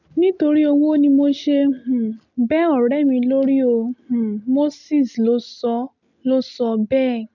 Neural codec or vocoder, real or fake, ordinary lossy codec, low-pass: none; real; none; 7.2 kHz